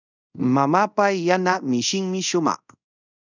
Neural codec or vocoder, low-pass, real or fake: codec, 24 kHz, 0.5 kbps, DualCodec; 7.2 kHz; fake